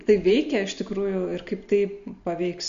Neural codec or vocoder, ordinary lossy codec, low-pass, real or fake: none; MP3, 48 kbps; 7.2 kHz; real